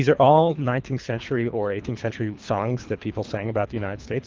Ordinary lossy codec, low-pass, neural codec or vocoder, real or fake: Opus, 32 kbps; 7.2 kHz; codec, 24 kHz, 3 kbps, HILCodec; fake